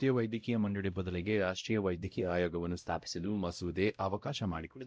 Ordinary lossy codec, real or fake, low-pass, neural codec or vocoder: none; fake; none; codec, 16 kHz, 0.5 kbps, X-Codec, WavLM features, trained on Multilingual LibriSpeech